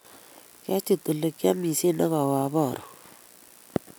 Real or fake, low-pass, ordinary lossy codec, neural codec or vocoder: real; none; none; none